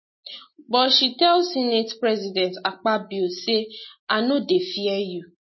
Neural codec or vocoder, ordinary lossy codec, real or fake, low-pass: none; MP3, 24 kbps; real; 7.2 kHz